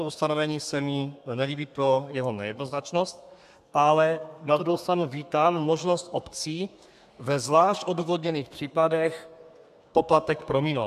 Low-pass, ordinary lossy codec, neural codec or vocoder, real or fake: 14.4 kHz; AAC, 96 kbps; codec, 32 kHz, 1.9 kbps, SNAC; fake